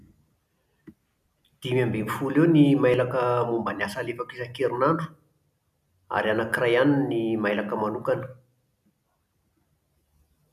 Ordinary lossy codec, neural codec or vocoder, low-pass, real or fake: none; none; 14.4 kHz; real